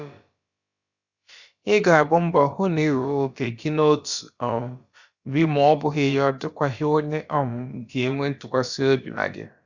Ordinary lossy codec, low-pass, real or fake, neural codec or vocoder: Opus, 64 kbps; 7.2 kHz; fake; codec, 16 kHz, about 1 kbps, DyCAST, with the encoder's durations